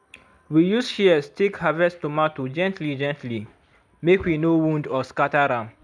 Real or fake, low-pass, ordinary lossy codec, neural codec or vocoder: real; 9.9 kHz; none; none